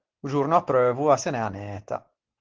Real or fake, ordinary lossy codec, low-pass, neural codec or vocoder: real; Opus, 16 kbps; 7.2 kHz; none